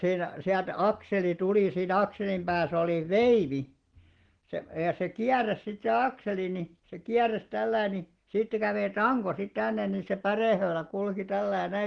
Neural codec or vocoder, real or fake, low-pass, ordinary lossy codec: none; real; 9.9 kHz; Opus, 16 kbps